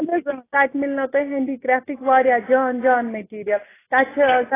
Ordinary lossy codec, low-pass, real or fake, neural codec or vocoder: AAC, 16 kbps; 3.6 kHz; real; none